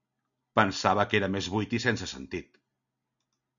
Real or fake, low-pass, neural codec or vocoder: real; 7.2 kHz; none